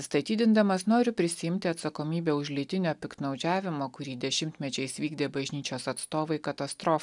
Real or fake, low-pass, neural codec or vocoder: real; 10.8 kHz; none